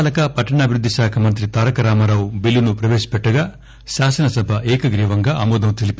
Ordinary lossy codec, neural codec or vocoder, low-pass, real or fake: none; none; none; real